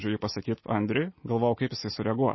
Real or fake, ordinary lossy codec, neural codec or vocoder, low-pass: real; MP3, 24 kbps; none; 7.2 kHz